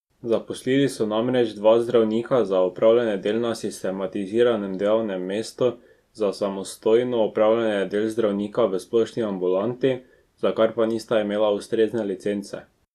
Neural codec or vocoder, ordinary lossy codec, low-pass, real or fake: none; Opus, 64 kbps; 14.4 kHz; real